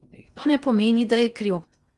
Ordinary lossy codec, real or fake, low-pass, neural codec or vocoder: Opus, 32 kbps; fake; 10.8 kHz; codec, 16 kHz in and 24 kHz out, 0.6 kbps, FocalCodec, streaming, 2048 codes